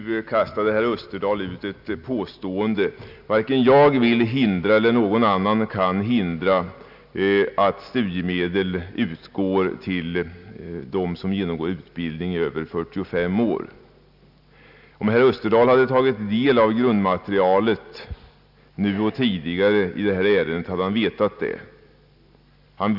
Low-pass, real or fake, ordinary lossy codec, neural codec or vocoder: 5.4 kHz; real; none; none